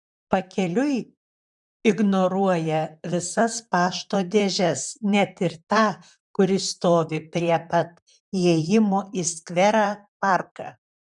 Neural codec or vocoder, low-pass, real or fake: vocoder, 44.1 kHz, 128 mel bands, Pupu-Vocoder; 10.8 kHz; fake